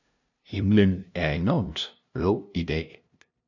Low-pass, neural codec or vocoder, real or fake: 7.2 kHz; codec, 16 kHz, 0.5 kbps, FunCodec, trained on LibriTTS, 25 frames a second; fake